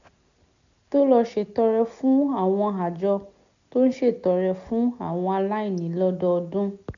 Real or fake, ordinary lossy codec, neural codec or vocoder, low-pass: real; none; none; 7.2 kHz